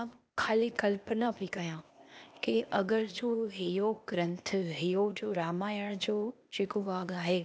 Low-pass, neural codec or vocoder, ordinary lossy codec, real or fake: none; codec, 16 kHz, 0.8 kbps, ZipCodec; none; fake